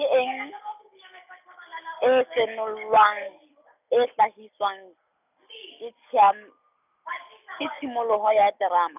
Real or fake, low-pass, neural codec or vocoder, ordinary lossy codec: real; 3.6 kHz; none; none